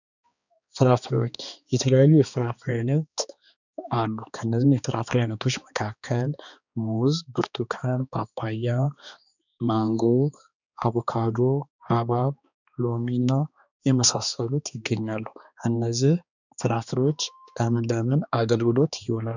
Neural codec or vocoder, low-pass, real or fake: codec, 16 kHz, 2 kbps, X-Codec, HuBERT features, trained on balanced general audio; 7.2 kHz; fake